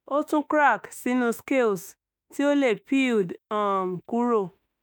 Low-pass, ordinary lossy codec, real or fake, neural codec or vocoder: none; none; fake; autoencoder, 48 kHz, 32 numbers a frame, DAC-VAE, trained on Japanese speech